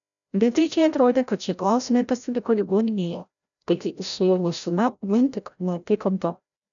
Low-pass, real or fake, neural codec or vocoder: 7.2 kHz; fake; codec, 16 kHz, 0.5 kbps, FreqCodec, larger model